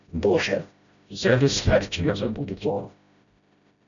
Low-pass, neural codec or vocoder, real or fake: 7.2 kHz; codec, 16 kHz, 0.5 kbps, FreqCodec, smaller model; fake